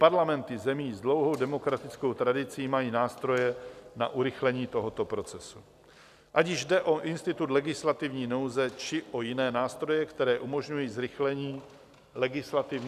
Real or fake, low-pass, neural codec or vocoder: real; 14.4 kHz; none